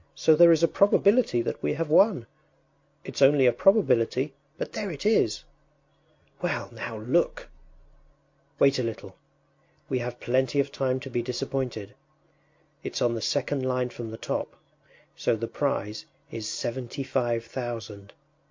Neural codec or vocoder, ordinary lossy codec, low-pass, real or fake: none; MP3, 48 kbps; 7.2 kHz; real